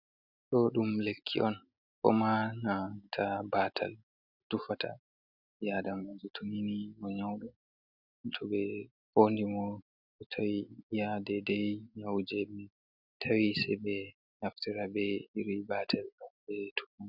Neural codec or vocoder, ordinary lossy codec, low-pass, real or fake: none; Opus, 64 kbps; 5.4 kHz; real